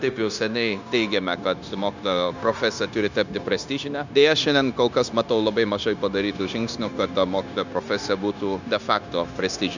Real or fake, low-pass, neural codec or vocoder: fake; 7.2 kHz; codec, 16 kHz, 0.9 kbps, LongCat-Audio-Codec